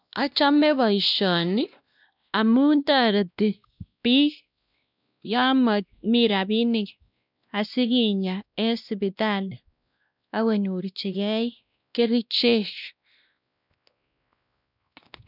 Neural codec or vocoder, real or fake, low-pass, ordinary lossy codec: codec, 16 kHz, 1 kbps, X-Codec, WavLM features, trained on Multilingual LibriSpeech; fake; 5.4 kHz; none